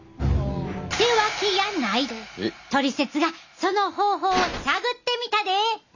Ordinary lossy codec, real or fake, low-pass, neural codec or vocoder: none; real; 7.2 kHz; none